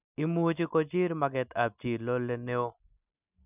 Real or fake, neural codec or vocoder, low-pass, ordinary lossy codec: real; none; 3.6 kHz; none